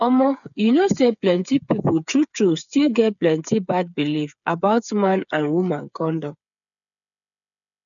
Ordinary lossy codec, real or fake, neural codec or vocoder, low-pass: none; fake; codec, 16 kHz, 8 kbps, FreqCodec, smaller model; 7.2 kHz